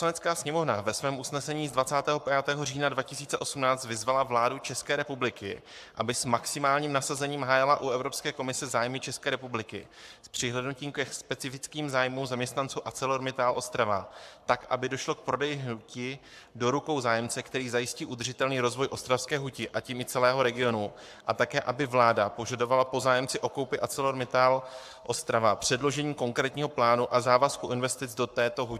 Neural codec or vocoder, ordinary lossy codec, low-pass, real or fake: codec, 44.1 kHz, 7.8 kbps, Pupu-Codec; AAC, 96 kbps; 14.4 kHz; fake